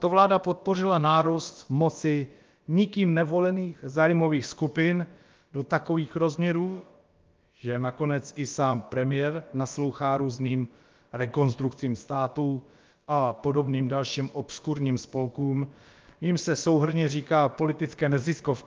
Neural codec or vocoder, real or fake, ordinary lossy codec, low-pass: codec, 16 kHz, about 1 kbps, DyCAST, with the encoder's durations; fake; Opus, 24 kbps; 7.2 kHz